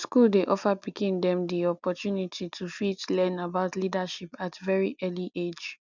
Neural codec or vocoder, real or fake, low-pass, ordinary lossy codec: none; real; 7.2 kHz; none